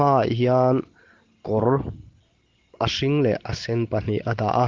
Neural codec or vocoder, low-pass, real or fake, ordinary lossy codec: none; 7.2 kHz; real; Opus, 16 kbps